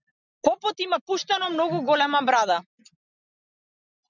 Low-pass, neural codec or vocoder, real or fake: 7.2 kHz; none; real